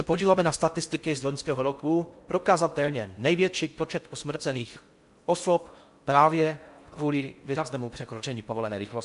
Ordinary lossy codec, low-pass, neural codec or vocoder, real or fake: MP3, 64 kbps; 10.8 kHz; codec, 16 kHz in and 24 kHz out, 0.6 kbps, FocalCodec, streaming, 4096 codes; fake